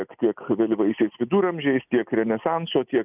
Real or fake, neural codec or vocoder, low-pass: real; none; 3.6 kHz